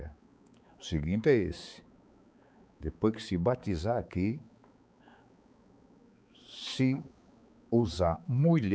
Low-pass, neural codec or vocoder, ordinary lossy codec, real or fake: none; codec, 16 kHz, 4 kbps, X-Codec, HuBERT features, trained on balanced general audio; none; fake